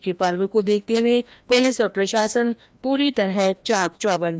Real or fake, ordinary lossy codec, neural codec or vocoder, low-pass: fake; none; codec, 16 kHz, 1 kbps, FreqCodec, larger model; none